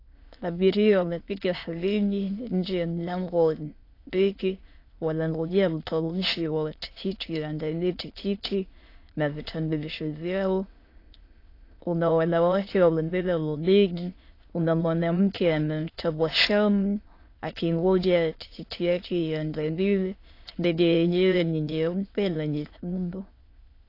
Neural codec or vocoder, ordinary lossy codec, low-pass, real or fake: autoencoder, 22.05 kHz, a latent of 192 numbers a frame, VITS, trained on many speakers; AAC, 32 kbps; 5.4 kHz; fake